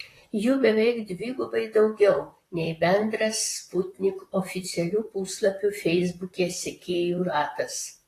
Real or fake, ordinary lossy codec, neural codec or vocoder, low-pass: fake; AAC, 48 kbps; vocoder, 44.1 kHz, 128 mel bands, Pupu-Vocoder; 14.4 kHz